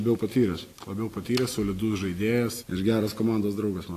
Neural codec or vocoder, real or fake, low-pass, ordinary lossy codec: none; real; 14.4 kHz; AAC, 48 kbps